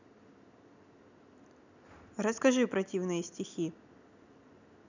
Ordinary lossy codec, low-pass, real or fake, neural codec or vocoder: none; 7.2 kHz; real; none